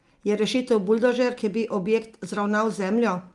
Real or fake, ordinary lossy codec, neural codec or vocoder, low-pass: real; Opus, 32 kbps; none; 10.8 kHz